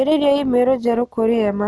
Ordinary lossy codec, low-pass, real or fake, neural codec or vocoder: none; none; real; none